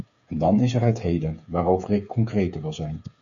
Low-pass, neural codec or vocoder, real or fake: 7.2 kHz; codec, 16 kHz, 8 kbps, FreqCodec, smaller model; fake